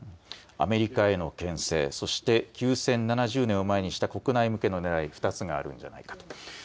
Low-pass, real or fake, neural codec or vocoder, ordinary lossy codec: none; real; none; none